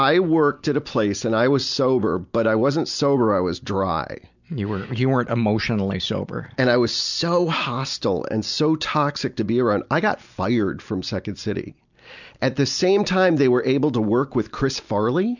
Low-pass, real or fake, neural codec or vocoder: 7.2 kHz; real; none